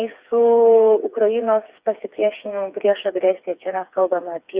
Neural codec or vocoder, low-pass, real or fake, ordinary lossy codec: codec, 16 kHz, 4 kbps, FreqCodec, smaller model; 3.6 kHz; fake; Opus, 64 kbps